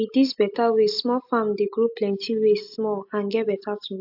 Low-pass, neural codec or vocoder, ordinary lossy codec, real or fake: 5.4 kHz; none; AAC, 48 kbps; real